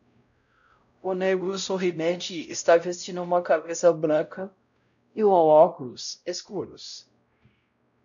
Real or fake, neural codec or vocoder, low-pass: fake; codec, 16 kHz, 0.5 kbps, X-Codec, WavLM features, trained on Multilingual LibriSpeech; 7.2 kHz